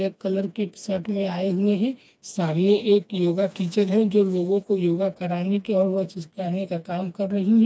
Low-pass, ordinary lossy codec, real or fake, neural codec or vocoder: none; none; fake; codec, 16 kHz, 2 kbps, FreqCodec, smaller model